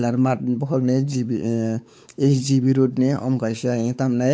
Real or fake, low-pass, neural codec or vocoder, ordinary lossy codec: fake; none; codec, 16 kHz, 4 kbps, X-Codec, WavLM features, trained on Multilingual LibriSpeech; none